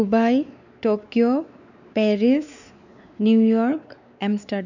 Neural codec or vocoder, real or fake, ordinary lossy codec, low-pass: codec, 16 kHz, 4 kbps, X-Codec, WavLM features, trained on Multilingual LibriSpeech; fake; none; 7.2 kHz